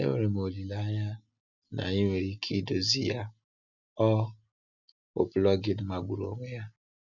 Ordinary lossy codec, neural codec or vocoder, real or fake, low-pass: none; none; real; 7.2 kHz